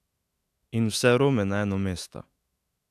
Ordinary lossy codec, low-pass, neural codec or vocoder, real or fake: AAC, 96 kbps; 14.4 kHz; autoencoder, 48 kHz, 128 numbers a frame, DAC-VAE, trained on Japanese speech; fake